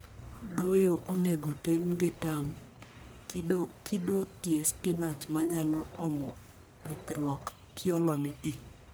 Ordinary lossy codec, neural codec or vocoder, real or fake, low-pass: none; codec, 44.1 kHz, 1.7 kbps, Pupu-Codec; fake; none